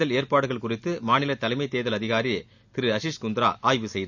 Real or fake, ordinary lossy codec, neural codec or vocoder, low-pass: real; none; none; 7.2 kHz